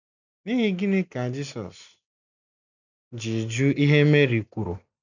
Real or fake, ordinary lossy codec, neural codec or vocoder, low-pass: real; none; none; 7.2 kHz